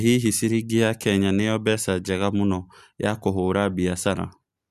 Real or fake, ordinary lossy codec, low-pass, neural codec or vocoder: real; Opus, 64 kbps; 14.4 kHz; none